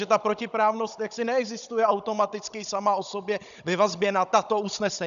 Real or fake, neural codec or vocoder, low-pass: fake; codec, 16 kHz, 16 kbps, FunCodec, trained on Chinese and English, 50 frames a second; 7.2 kHz